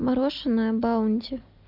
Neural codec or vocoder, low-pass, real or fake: none; 5.4 kHz; real